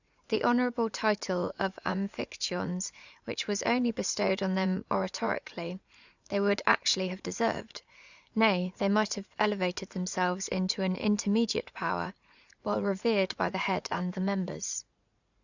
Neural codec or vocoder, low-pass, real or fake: vocoder, 44.1 kHz, 80 mel bands, Vocos; 7.2 kHz; fake